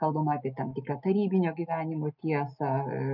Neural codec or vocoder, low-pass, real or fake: none; 5.4 kHz; real